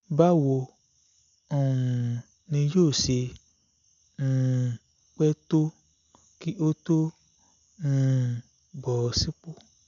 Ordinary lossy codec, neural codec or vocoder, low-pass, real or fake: none; none; 7.2 kHz; real